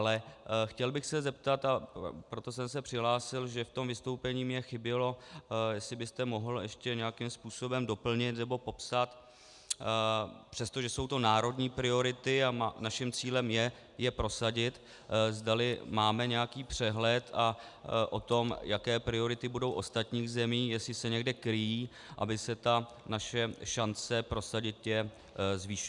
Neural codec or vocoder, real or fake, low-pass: none; real; 10.8 kHz